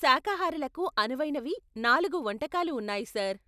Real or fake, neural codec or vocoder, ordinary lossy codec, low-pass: real; none; none; 14.4 kHz